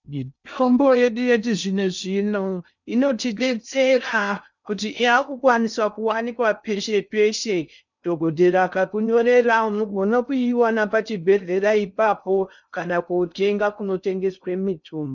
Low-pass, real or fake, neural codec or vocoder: 7.2 kHz; fake; codec, 16 kHz in and 24 kHz out, 0.6 kbps, FocalCodec, streaming, 2048 codes